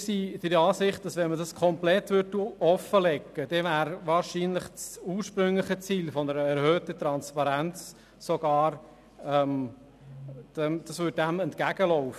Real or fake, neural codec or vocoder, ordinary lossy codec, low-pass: real; none; none; 14.4 kHz